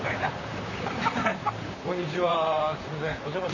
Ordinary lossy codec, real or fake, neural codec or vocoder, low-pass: none; fake; vocoder, 44.1 kHz, 128 mel bands, Pupu-Vocoder; 7.2 kHz